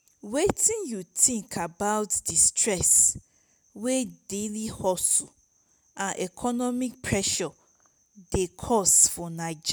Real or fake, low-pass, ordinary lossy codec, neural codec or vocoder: real; none; none; none